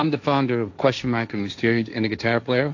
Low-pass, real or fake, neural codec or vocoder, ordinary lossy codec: 7.2 kHz; fake; codec, 16 kHz, 1.1 kbps, Voila-Tokenizer; AAC, 48 kbps